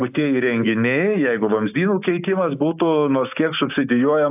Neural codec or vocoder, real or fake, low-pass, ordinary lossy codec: codec, 16 kHz, 6 kbps, DAC; fake; 3.6 kHz; AAC, 32 kbps